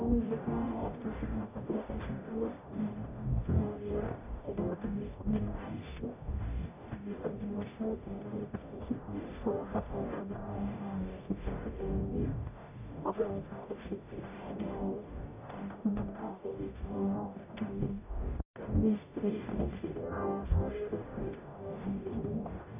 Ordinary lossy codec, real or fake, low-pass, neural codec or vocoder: none; fake; 3.6 kHz; codec, 44.1 kHz, 0.9 kbps, DAC